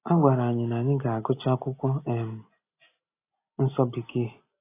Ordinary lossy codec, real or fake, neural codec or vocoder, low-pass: AAC, 24 kbps; real; none; 3.6 kHz